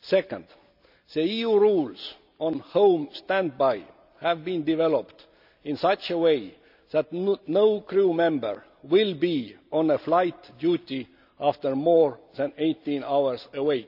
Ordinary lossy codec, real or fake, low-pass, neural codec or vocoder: none; real; 5.4 kHz; none